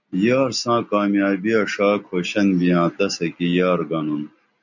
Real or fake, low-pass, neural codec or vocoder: real; 7.2 kHz; none